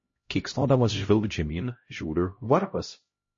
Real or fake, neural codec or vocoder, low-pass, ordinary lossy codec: fake; codec, 16 kHz, 0.5 kbps, X-Codec, HuBERT features, trained on LibriSpeech; 7.2 kHz; MP3, 32 kbps